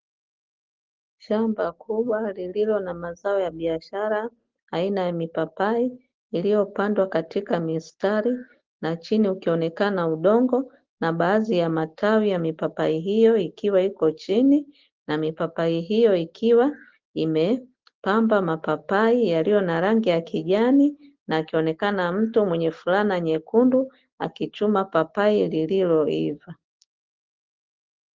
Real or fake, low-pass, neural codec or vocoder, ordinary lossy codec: real; 7.2 kHz; none; Opus, 16 kbps